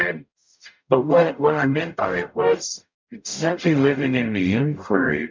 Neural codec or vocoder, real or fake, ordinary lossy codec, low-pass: codec, 44.1 kHz, 0.9 kbps, DAC; fake; MP3, 64 kbps; 7.2 kHz